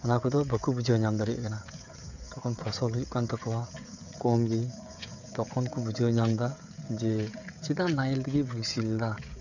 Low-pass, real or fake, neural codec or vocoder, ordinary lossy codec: 7.2 kHz; fake; codec, 16 kHz, 16 kbps, FreqCodec, smaller model; none